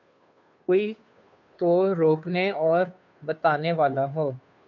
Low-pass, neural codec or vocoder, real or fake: 7.2 kHz; codec, 16 kHz, 2 kbps, FunCodec, trained on Chinese and English, 25 frames a second; fake